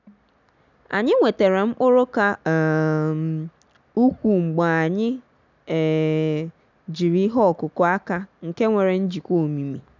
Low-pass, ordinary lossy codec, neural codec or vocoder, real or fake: 7.2 kHz; none; none; real